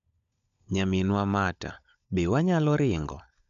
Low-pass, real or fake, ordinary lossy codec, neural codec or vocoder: 7.2 kHz; fake; none; codec, 16 kHz, 16 kbps, FunCodec, trained on LibriTTS, 50 frames a second